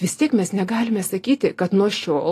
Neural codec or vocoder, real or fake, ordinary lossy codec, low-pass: none; real; AAC, 48 kbps; 14.4 kHz